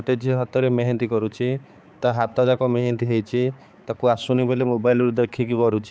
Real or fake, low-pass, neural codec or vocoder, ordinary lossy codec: fake; none; codec, 16 kHz, 4 kbps, X-Codec, HuBERT features, trained on balanced general audio; none